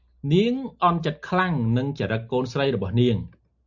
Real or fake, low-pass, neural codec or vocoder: real; 7.2 kHz; none